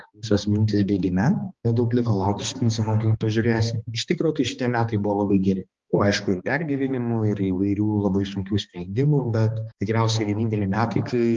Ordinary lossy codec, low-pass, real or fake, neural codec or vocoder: Opus, 32 kbps; 7.2 kHz; fake; codec, 16 kHz, 2 kbps, X-Codec, HuBERT features, trained on balanced general audio